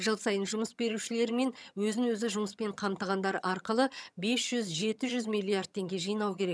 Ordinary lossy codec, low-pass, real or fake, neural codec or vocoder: none; none; fake; vocoder, 22.05 kHz, 80 mel bands, HiFi-GAN